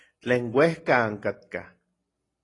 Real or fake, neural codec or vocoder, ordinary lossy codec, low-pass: real; none; AAC, 32 kbps; 10.8 kHz